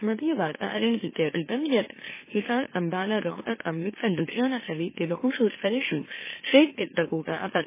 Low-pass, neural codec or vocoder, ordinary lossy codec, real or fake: 3.6 kHz; autoencoder, 44.1 kHz, a latent of 192 numbers a frame, MeloTTS; MP3, 16 kbps; fake